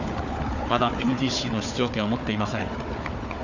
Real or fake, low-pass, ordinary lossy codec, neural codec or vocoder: fake; 7.2 kHz; none; codec, 16 kHz, 4 kbps, FunCodec, trained on Chinese and English, 50 frames a second